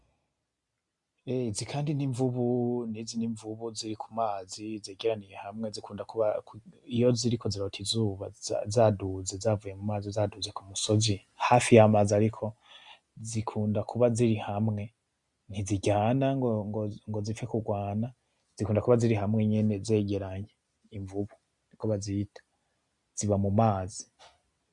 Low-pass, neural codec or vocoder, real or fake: 10.8 kHz; none; real